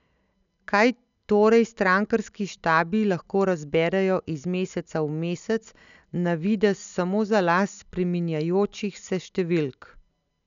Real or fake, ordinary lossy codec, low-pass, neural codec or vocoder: real; none; 7.2 kHz; none